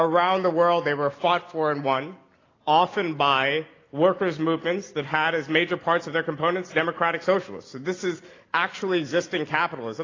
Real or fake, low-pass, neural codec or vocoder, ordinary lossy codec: real; 7.2 kHz; none; AAC, 32 kbps